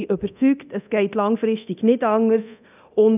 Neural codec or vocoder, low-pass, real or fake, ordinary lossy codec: codec, 24 kHz, 0.9 kbps, DualCodec; 3.6 kHz; fake; none